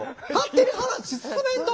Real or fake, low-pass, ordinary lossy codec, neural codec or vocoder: real; none; none; none